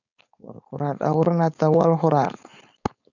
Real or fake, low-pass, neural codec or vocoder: fake; 7.2 kHz; codec, 16 kHz, 4.8 kbps, FACodec